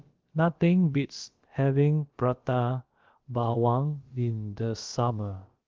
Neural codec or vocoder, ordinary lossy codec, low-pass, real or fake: codec, 16 kHz, about 1 kbps, DyCAST, with the encoder's durations; Opus, 16 kbps; 7.2 kHz; fake